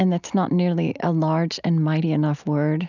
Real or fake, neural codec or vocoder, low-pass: real; none; 7.2 kHz